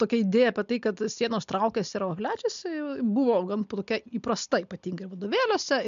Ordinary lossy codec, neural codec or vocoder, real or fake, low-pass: MP3, 64 kbps; none; real; 7.2 kHz